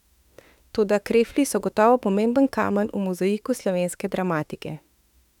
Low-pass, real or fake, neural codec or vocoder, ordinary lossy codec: 19.8 kHz; fake; autoencoder, 48 kHz, 32 numbers a frame, DAC-VAE, trained on Japanese speech; none